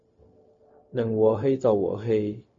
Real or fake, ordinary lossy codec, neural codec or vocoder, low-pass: fake; MP3, 32 kbps; codec, 16 kHz, 0.4 kbps, LongCat-Audio-Codec; 7.2 kHz